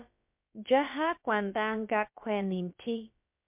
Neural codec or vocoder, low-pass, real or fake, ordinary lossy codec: codec, 16 kHz, about 1 kbps, DyCAST, with the encoder's durations; 3.6 kHz; fake; MP3, 32 kbps